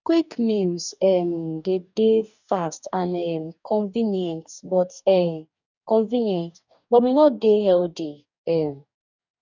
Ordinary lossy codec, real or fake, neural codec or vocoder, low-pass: none; fake; codec, 44.1 kHz, 2.6 kbps, DAC; 7.2 kHz